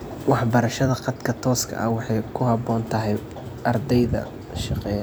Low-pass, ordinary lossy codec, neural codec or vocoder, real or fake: none; none; vocoder, 44.1 kHz, 128 mel bands every 256 samples, BigVGAN v2; fake